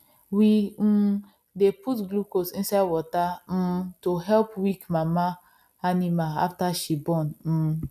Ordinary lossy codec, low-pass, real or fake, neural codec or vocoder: none; 14.4 kHz; real; none